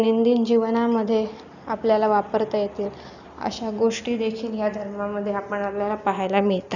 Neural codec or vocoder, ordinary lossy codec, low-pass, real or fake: none; none; 7.2 kHz; real